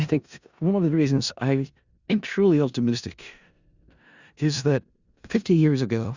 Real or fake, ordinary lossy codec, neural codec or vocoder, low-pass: fake; Opus, 64 kbps; codec, 16 kHz in and 24 kHz out, 0.4 kbps, LongCat-Audio-Codec, four codebook decoder; 7.2 kHz